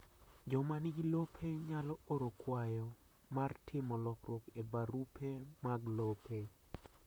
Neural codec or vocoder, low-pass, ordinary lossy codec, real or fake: vocoder, 44.1 kHz, 128 mel bands, Pupu-Vocoder; none; none; fake